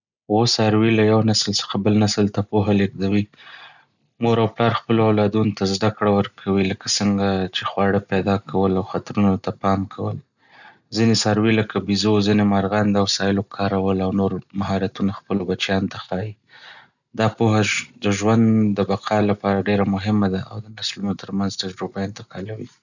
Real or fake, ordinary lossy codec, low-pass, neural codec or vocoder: real; none; 7.2 kHz; none